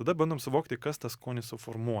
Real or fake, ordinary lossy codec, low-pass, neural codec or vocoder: real; Opus, 64 kbps; 19.8 kHz; none